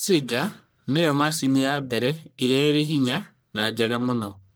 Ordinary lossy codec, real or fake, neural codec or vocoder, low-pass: none; fake; codec, 44.1 kHz, 1.7 kbps, Pupu-Codec; none